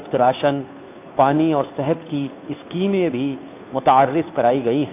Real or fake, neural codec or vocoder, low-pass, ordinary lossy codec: fake; codec, 16 kHz, 2 kbps, FunCodec, trained on Chinese and English, 25 frames a second; 3.6 kHz; AAC, 24 kbps